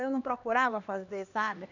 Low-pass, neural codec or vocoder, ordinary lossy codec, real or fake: 7.2 kHz; codec, 16 kHz, 2 kbps, X-Codec, HuBERT features, trained on LibriSpeech; none; fake